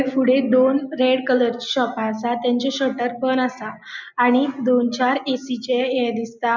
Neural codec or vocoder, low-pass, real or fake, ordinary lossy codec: none; 7.2 kHz; real; none